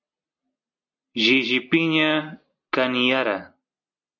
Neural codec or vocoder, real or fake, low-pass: none; real; 7.2 kHz